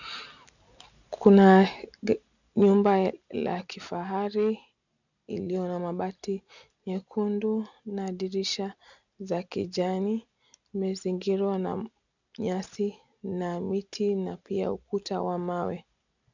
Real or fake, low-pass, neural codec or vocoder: real; 7.2 kHz; none